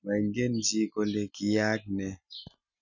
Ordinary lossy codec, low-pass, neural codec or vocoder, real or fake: none; 7.2 kHz; none; real